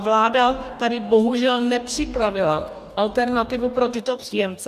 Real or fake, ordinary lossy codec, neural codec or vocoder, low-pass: fake; AAC, 96 kbps; codec, 44.1 kHz, 2.6 kbps, DAC; 14.4 kHz